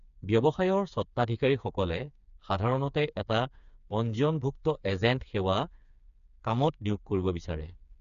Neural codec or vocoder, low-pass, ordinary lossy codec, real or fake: codec, 16 kHz, 4 kbps, FreqCodec, smaller model; 7.2 kHz; none; fake